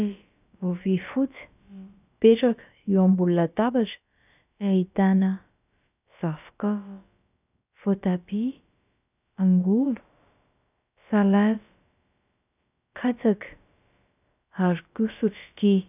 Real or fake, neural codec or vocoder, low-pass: fake; codec, 16 kHz, about 1 kbps, DyCAST, with the encoder's durations; 3.6 kHz